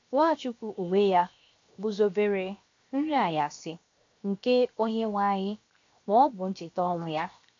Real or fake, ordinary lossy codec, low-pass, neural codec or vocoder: fake; AAC, 48 kbps; 7.2 kHz; codec, 16 kHz, 0.7 kbps, FocalCodec